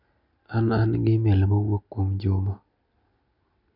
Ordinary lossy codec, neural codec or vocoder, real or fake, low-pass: none; none; real; 5.4 kHz